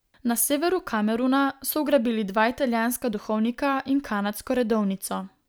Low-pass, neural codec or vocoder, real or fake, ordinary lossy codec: none; none; real; none